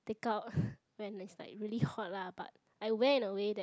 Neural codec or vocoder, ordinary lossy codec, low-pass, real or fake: none; none; none; real